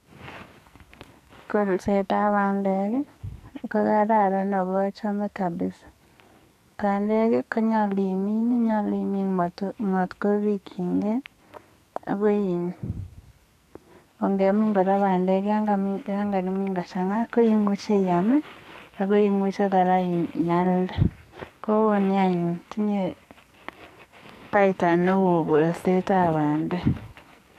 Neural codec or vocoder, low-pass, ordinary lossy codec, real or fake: codec, 44.1 kHz, 2.6 kbps, SNAC; 14.4 kHz; none; fake